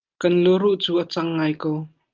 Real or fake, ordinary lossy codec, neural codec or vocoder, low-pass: real; Opus, 32 kbps; none; 7.2 kHz